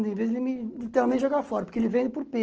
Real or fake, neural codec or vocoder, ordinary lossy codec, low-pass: real; none; Opus, 16 kbps; 7.2 kHz